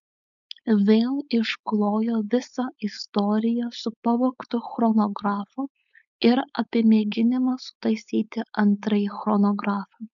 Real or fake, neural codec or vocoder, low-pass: fake; codec, 16 kHz, 4.8 kbps, FACodec; 7.2 kHz